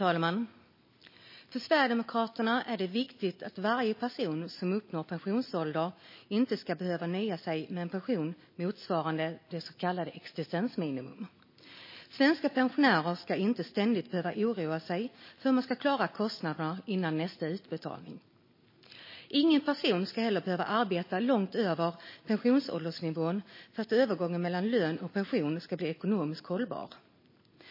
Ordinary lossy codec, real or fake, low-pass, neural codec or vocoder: MP3, 24 kbps; real; 5.4 kHz; none